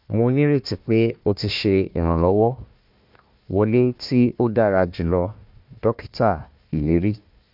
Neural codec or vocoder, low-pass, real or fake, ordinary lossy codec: codec, 16 kHz, 1 kbps, FunCodec, trained on Chinese and English, 50 frames a second; 5.4 kHz; fake; MP3, 48 kbps